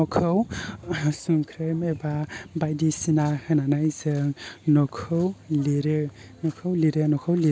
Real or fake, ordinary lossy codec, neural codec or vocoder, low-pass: real; none; none; none